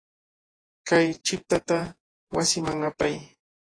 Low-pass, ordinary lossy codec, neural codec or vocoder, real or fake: 9.9 kHz; AAC, 32 kbps; vocoder, 48 kHz, 128 mel bands, Vocos; fake